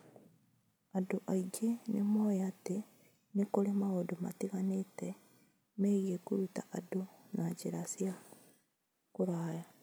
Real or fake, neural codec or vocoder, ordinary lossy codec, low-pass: real; none; none; none